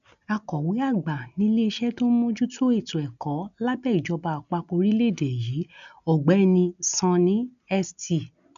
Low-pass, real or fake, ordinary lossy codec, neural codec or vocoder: 7.2 kHz; real; none; none